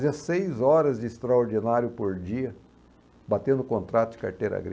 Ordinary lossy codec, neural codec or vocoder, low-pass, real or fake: none; none; none; real